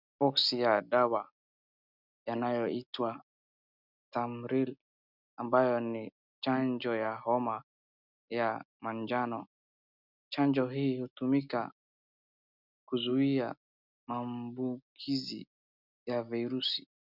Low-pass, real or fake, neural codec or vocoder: 5.4 kHz; real; none